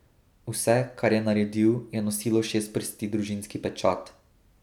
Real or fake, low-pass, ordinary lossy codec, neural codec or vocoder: real; 19.8 kHz; none; none